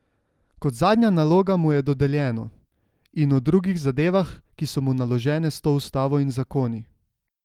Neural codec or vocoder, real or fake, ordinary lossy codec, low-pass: none; real; Opus, 24 kbps; 19.8 kHz